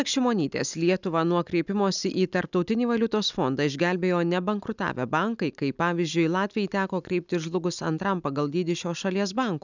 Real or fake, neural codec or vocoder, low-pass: real; none; 7.2 kHz